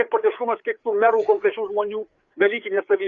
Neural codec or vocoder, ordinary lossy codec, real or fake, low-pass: codec, 16 kHz, 4 kbps, FreqCodec, larger model; Opus, 64 kbps; fake; 7.2 kHz